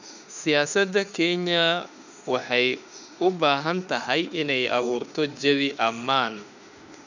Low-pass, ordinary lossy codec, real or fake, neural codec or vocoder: 7.2 kHz; none; fake; autoencoder, 48 kHz, 32 numbers a frame, DAC-VAE, trained on Japanese speech